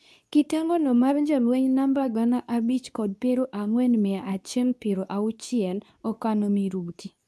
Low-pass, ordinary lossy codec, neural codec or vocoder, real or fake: none; none; codec, 24 kHz, 0.9 kbps, WavTokenizer, medium speech release version 2; fake